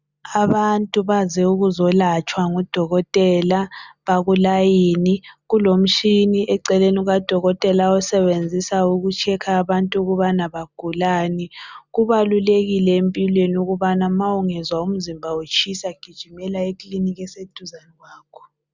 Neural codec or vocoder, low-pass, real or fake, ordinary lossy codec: none; 7.2 kHz; real; Opus, 64 kbps